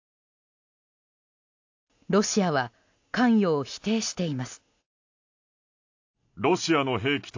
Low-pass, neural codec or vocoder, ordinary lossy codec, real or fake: 7.2 kHz; none; AAC, 48 kbps; real